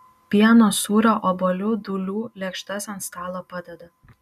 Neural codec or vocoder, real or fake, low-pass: none; real; 14.4 kHz